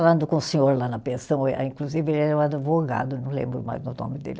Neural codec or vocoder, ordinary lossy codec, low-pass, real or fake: none; none; none; real